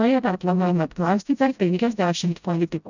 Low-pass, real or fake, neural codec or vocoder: 7.2 kHz; fake; codec, 16 kHz, 0.5 kbps, FreqCodec, smaller model